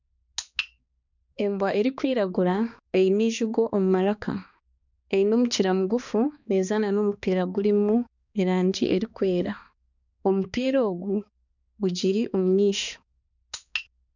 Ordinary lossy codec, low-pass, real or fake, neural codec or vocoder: none; 7.2 kHz; fake; codec, 16 kHz, 2 kbps, X-Codec, HuBERT features, trained on balanced general audio